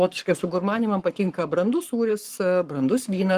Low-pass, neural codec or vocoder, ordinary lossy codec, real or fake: 14.4 kHz; codec, 44.1 kHz, 7.8 kbps, Pupu-Codec; Opus, 24 kbps; fake